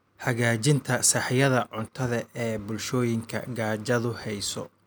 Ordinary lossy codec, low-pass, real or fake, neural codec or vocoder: none; none; real; none